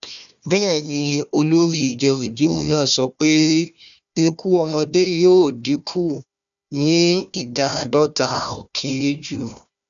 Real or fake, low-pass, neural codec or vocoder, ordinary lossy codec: fake; 7.2 kHz; codec, 16 kHz, 1 kbps, FunCodec, trained on Chinese and English, 50 frames a second; none